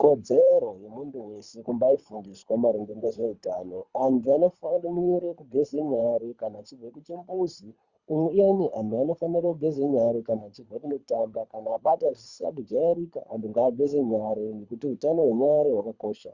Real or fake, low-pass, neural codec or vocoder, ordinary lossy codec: fake; 7.2 kHz; codec, 24 kHz, 3 kbps, HILCodec; Opus, 64 kbps